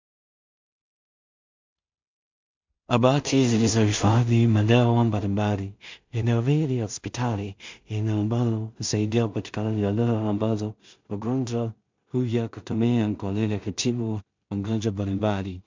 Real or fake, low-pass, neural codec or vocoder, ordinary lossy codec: fake; 7.2 kHz; codec, 16 kHz in and 24 kHz out, 0.4 kbps, LongCat-Audio-Codec, two codebook decoder; MP3, 64 kbps